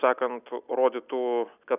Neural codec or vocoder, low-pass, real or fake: none; 3.6 kHz; real